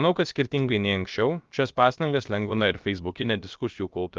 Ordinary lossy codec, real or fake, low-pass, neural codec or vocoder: Opus, 32 kbps; fake; 7.2 kHz; codec, 16 kHz, about 1 kbps, DyCAST, with the encoder's durations